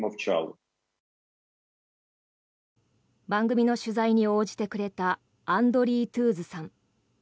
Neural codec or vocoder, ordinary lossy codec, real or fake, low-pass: none; none; real; none